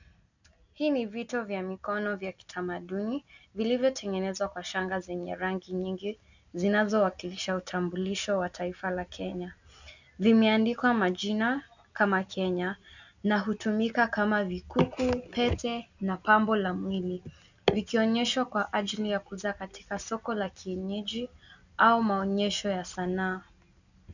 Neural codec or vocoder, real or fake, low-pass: none; real; 7.2 kHz